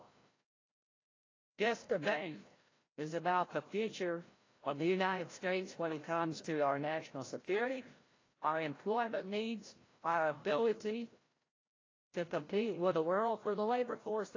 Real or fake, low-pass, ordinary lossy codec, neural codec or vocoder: fake; 7.2 kHz; AAC, 32 kbps; codec, 16 kHz, 0.5 kbps, FreqCodec, larger model